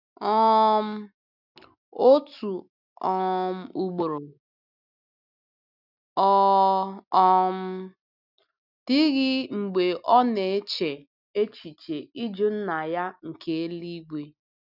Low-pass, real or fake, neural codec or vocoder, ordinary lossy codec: 5.4 kHz; real; none; none